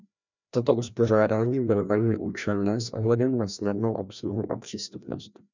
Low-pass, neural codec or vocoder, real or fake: 7.2 kHz; codec, 16 kHz, 1 kbps, FreqCodec, larger model; fake